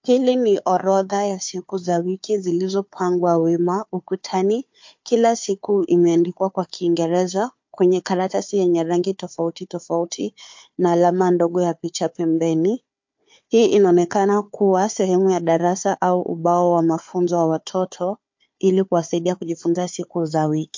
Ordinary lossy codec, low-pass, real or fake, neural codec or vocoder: MP3, 48 kbps; 7.2 kHz; fake; codec, 16 kHz, 4 kbps, FunCodec, trained on Chinese and English, 50 frames a second